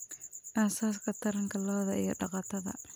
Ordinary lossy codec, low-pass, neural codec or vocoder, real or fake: none; none; none; real